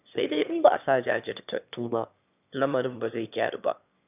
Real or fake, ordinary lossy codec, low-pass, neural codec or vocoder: fake; none; 3.6 kHz; autoencoder, 22.05 kHz, a latent of 192 numbers a frame, VITS, trained on one speaker